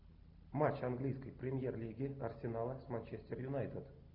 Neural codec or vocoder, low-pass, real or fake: none; 5.4 kHz; real